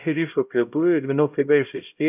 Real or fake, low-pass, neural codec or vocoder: fake; 3.6 kHz; codec, 16 kHz, 0.5 kbps, X-Codec, HuBERT features, trained on LibriSpeech